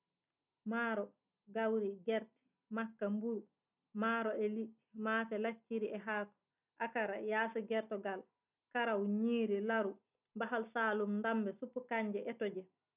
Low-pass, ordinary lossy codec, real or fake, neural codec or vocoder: 3.6 kHz; none; real; none